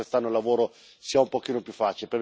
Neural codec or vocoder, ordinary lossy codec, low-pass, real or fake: none; none; none; real